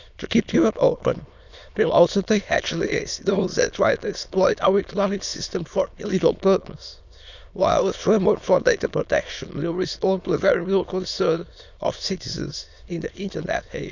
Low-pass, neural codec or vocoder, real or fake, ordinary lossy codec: 7.2 kHz; autoencoder, 22.05 kHz, a latent of 192 numbers a frame, VITS, trained on many speakers; fake; none